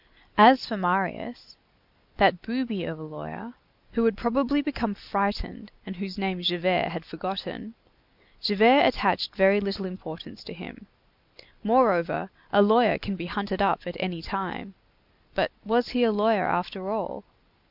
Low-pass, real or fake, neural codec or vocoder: 5.4 kHz; real; none